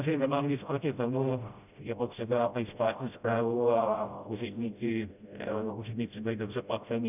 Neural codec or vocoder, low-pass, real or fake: codec, 16 kHz, 0.5 kbps, FreqCodec, smaller model; 3.6 kHz; fake